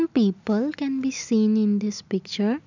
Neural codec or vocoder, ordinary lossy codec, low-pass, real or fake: none; none; 7.2 kHz; real